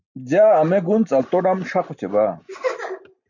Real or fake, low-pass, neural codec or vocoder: real; 7.2 kHz; none